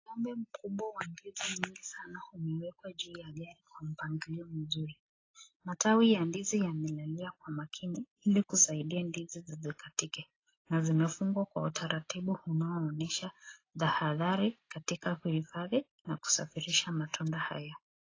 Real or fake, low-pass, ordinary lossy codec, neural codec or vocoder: real; 7.2 kHz; AAC, 32 kbps; none